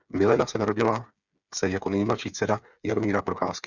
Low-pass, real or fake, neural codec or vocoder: 7.2 kHz; fake; codec, 16 kHz, 8 kbps, FreqCodec, smaller model